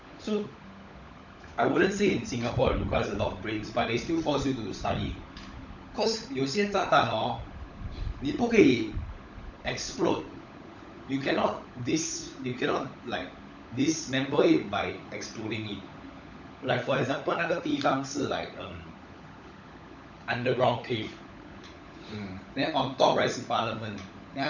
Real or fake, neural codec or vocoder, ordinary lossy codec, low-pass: fake; codec, 16 kHz, 16 kbps, FunCodec, trained on LibriTTS, 50 frames a second; none; 7.2 kHz